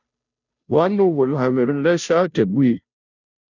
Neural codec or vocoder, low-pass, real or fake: codec, 16 kHz, 0.5 kbps, FunCodec, trained on Chinese and English, 25 frames a second; 7.2 kHz; fake